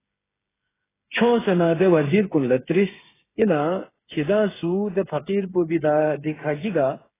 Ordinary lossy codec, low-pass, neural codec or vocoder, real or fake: AAC, 16 kbps; 3.6 kHz; codec, 16 kHz, 8 kbps, FreqCodec, smaller model; fake